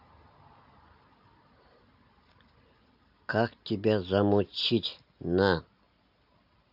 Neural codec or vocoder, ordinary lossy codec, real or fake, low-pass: none; none; real; 5.4 kHz